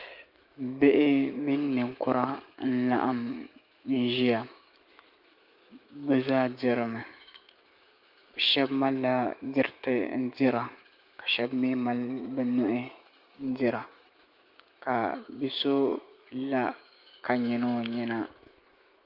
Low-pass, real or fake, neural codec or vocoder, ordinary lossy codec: 5.4 kHz; fake; autoencoder, 48 kHz, 128 numbers a frame, DAC-VAE, trained on Japanese speech; Opus, 24 kbps